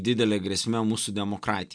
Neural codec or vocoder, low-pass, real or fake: none; 9.9 kHz; real